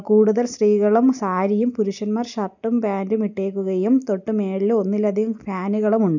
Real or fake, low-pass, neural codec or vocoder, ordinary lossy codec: real; 7.2 kHz; none; none